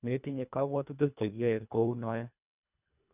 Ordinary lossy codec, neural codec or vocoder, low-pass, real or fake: none; codec, 24 kHz, 1.5 kbps, HILCodec; 3.6 kHz; fake